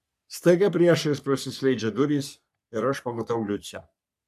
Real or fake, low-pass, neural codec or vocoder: fake; 14.4 kHz; codec, 44.1 kHz, 3.4 kbps, Pupu-Codec